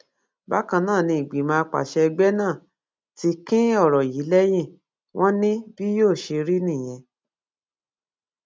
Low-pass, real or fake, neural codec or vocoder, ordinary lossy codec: none; real; none; none